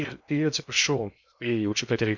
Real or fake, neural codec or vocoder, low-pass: fake; codec, 16 kHz in and 24 kHz out, 0.6 kbps, FocalCodec, streaming, 2048 codes; 7.2 kHz